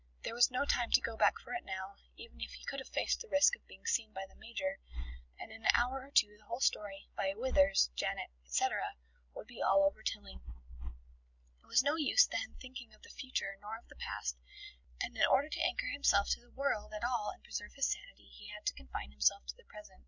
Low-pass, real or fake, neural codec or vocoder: 7.2 kHz; real; none